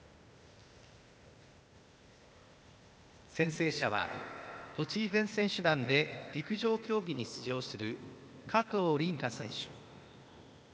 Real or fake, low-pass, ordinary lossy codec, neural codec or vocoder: fake; none; none; codec, 16 kHz, 0.8 kbps, ZipCodec